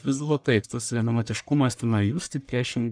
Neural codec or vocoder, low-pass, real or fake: codec, 44.1 kHz, 1.7 kbps, Pupu-Codec; 9.9 kHz; fake